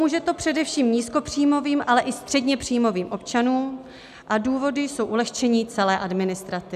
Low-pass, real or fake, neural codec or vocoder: 14.4 kHz; real; none